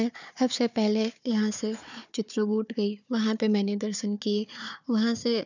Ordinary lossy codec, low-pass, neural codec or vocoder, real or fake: none; 7.2 kHz; codec, 16 kHz, 4 kbps, FunCodec, trained on Chinese and English, 50 frames a second; fake